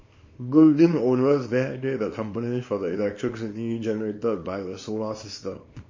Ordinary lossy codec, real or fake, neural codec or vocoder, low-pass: MP3, 32 kbps; fake; codec, 24 kHz, 0.9 kbps, WavTokenizer, small release; 7.2 kHz